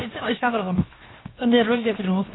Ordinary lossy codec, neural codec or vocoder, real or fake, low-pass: AAC, 16 kbps; codec, 16 kHz, 0.7 kbps, FocalCodec; fake; 7.2 kHz